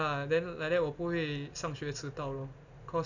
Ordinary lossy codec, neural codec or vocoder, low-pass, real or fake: none; none; 7.2 kHz; real